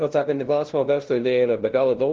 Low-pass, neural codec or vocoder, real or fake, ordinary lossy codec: 7.2 kHz; codec, 16 kHz, 0.5 kbps, FunCodec, trained on LibriTTS, 25 frames a second; fake; Opus, 16 kbps